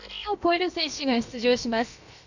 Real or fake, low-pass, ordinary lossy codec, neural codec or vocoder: fake; 7.2 kHz; none; codec, 16 kHz, about 1 kbps, DyCAST, with the encoder's durations